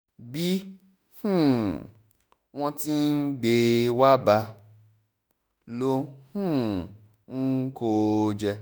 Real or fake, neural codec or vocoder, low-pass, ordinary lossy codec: fake; autoencoder, 48 kHz, 32 numbers a frame, DAC-VAE, trained on Japanese speech; none; none